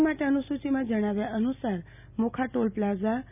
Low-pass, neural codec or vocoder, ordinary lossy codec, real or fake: 3.6 kHz; none; none; real